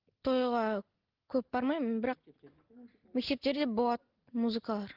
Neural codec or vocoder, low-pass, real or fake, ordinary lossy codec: none; 5.4 kHz; real; Opus, 16 kbps